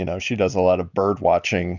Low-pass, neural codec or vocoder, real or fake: 7.2 kHz; none; real